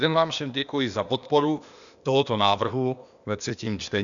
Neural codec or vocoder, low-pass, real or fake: codec, 16 kHz, 0.8 kbps, ZipCodec; 7.2 kHz; fake